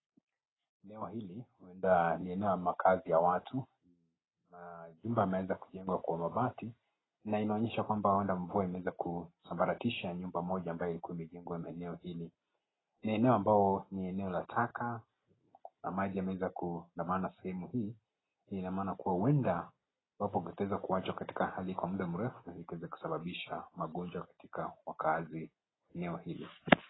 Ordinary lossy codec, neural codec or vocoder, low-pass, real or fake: AAC, 16 kbps; none; 7.2 kHz; real